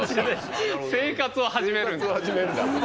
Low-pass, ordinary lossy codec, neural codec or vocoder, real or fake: none; none; none; real